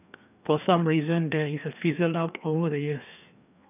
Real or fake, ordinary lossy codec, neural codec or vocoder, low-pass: fake; none; codec, 16 kHz, 2 kbps, FreqCodec, larger model; 3.6 kHz